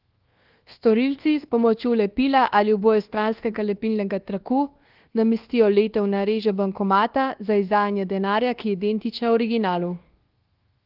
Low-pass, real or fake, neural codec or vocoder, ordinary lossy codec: 5.4 kHz; fake; codec, 24 kHz, 1.2 kbps, DualCodec; Opus, 16 kbps